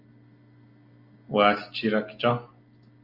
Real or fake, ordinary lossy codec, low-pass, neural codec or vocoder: real; Opus, 32 kbps; 5.4 kHz; none